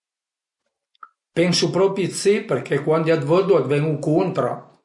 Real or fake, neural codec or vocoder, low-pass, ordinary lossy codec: real; none; 10.8 kHz; MP3, 48 kbps